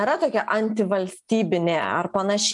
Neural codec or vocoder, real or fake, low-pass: none; real; 10.8 kHz